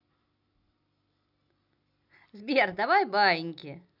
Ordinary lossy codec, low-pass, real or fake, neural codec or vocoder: none; 5.4 kHz; real; none